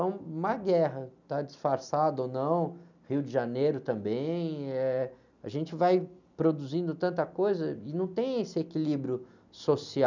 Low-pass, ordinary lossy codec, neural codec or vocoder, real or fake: 7.2 kHz; none; none; real